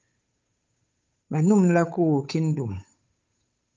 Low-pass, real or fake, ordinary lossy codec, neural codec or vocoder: 7.2 kHz; fake; Opus, 24 kbps; codec, 16 kHz, 8 kbps, FunCodec, trained on Chinese and English, 25 frames a second